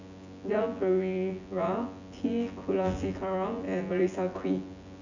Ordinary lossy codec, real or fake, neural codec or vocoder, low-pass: none; fake; vocoder, 24 kHz, 100 mel bands, Vocos; 7.2 kHz